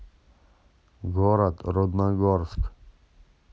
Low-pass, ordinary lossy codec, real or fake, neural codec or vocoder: none; none; real; none